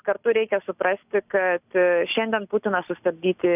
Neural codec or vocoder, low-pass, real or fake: none; 3.6 kHz; real